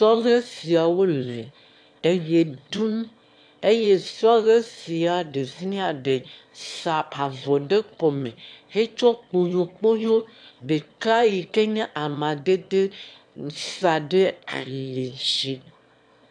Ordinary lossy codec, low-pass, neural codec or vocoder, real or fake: AAC, 64 kbps; 9.9 kHz; autoencoder, 22.05 kHz, a latent of 192 numbers a frame, VITS, trained on one speaker; fake